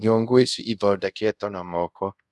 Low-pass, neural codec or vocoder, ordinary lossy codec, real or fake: none; codec, 24 kHz, 0.5 kbps, DualCodec; none; fake